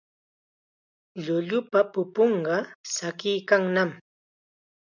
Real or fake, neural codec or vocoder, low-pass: real; none; 7.2 kHz